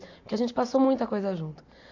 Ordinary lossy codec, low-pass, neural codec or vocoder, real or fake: AAC, 48 kbps; 7.2 kHz; none; real